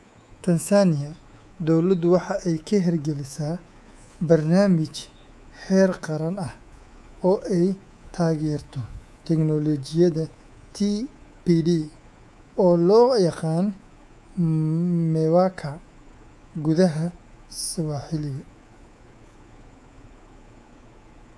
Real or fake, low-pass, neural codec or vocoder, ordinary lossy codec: fake; none; codec, 24 kHz, 3.1 kbps, DualCodec; none